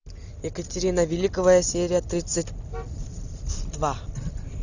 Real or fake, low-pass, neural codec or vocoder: real; 7.2 kHz; none